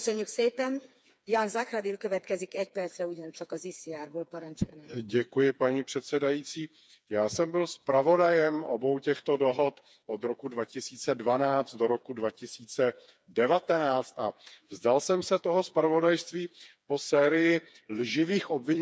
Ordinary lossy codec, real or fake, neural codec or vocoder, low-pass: none; fake; codec, 16 kHz, 4 kbps, FreqCodec, smaller model; none